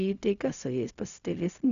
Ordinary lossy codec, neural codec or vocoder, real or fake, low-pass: MP3, 96 kbps; codec, 16 kHz, 0.4 kbps, LongCat-Audio-Codec; fake; 7.2 kHz